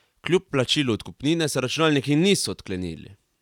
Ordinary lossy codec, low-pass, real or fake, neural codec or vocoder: none; 19.8 kHz; real; none